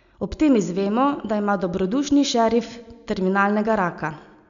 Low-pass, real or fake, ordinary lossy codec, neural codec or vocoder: 7.2 kHz; real; MP3, 96 kbps; none